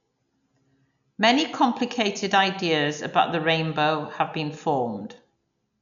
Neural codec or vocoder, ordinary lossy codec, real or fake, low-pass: none; none; real; 7.2 kHz